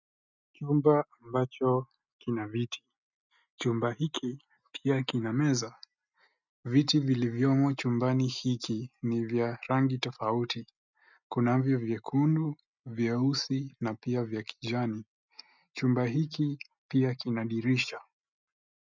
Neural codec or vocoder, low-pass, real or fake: none; 7.2 kHz; real